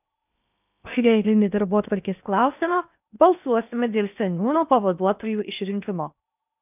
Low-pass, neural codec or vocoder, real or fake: 3.6 kHz; codec, 16 kHz in and 24 kHz out, 0.8 kbps, FocalCodec, streaming, 65536 codes; fake